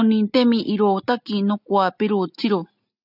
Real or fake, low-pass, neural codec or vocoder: real; 5.4 kHz; none